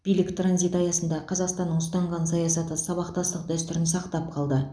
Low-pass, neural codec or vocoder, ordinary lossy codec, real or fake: none; none; none; real